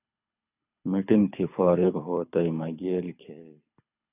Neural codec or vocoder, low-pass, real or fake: codec, 24 kHz, 6 kbps, HILCodec; 3.6 kHz; fake